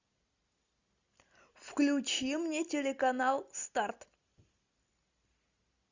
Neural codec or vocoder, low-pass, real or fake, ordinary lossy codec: none; 7.2 kHz; real; Opus, 64 kbps